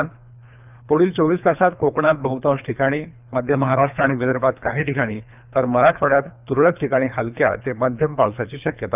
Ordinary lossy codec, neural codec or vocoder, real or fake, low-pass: none; codec, 24 kHz, 3 kbps, HILCodec; fake; 3.6 kHz